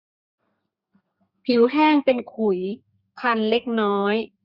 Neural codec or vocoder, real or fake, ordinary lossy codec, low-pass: codec, 32 kHz, 1.9 kbps, SNAC; fake; Opus, 64 kbps; 5.4 kHz